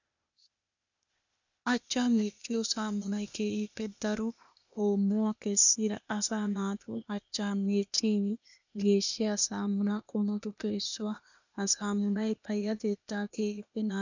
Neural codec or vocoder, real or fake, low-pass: codec, 16 kHz, 0.8 kbps, ZipCodec; fake; 7.2 kHz